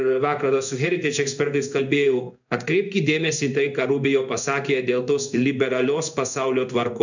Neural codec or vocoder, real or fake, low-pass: codec, 16 kHz in and 24 kHz out, 1 kbps, XY-Tokenizer; fake; 7.2 kHz